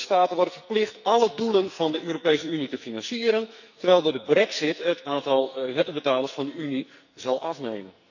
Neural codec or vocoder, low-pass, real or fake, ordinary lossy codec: codec, 44.1 kHz, 2.6 kbps, SNAC; 7.2 kHz; fake; none